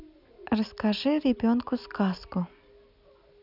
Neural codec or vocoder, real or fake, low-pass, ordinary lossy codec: none; real; 5.4 kHz; none